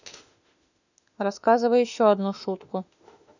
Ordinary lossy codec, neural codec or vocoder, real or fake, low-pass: none; autoencoder, 48 kHz, 32 numbers a frame, DAC-VAE, trained on Japanese speech; fake; 7.2 kHz